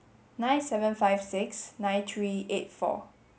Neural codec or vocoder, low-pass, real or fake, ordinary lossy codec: none; none; real; none